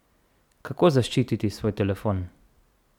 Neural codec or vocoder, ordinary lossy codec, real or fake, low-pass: none; none; real; 19.8 kHz